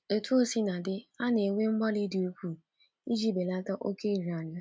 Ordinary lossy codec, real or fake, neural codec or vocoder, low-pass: none; real; none; none